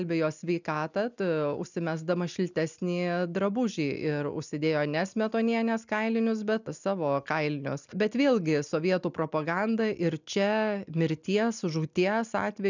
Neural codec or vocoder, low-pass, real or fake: none; 7.2 kHz; real